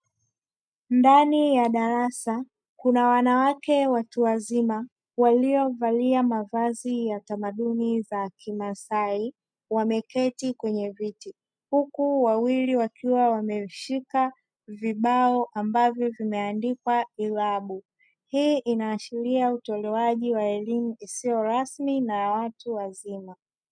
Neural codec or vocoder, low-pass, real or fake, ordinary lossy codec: none; 9.9 kHz; real; MP3, 96 kbps